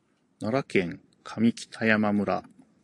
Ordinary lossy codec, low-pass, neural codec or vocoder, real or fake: AAC, 48 kbps; 10.8 kHz; none; real